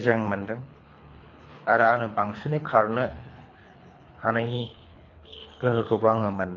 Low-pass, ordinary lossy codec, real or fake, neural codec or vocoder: 7.2 kHz; AAC, 32 kbps; fake; codec, 24 kHz, 3 kbps, HILCodec